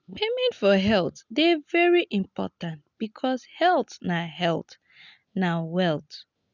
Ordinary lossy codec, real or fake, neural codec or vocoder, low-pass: none; real; none; 7.2 kHz